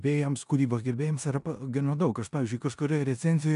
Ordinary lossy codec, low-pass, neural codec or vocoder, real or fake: AAC, 96 kbps; 10.8 kHz; codec, 16 kHz in and 24 kHz out, 0.9 kbps, LongCat-Audio-Codec, four codebook decoder; fake